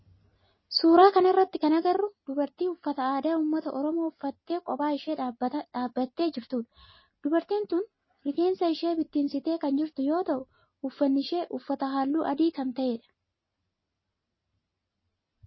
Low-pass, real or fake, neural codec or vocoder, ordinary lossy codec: 7.2 kHz; real; none; MP3, 24 kbps